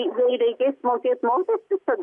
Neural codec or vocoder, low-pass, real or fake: none; 10.8 kHz; real